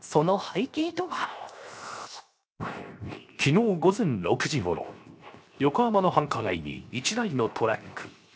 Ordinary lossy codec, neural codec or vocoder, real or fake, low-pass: none; codec, 16 kHz, 0.7 kbps, FocalCodec; fake; none